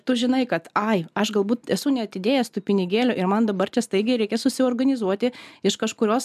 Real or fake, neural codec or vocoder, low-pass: real; none; 14.4 kHz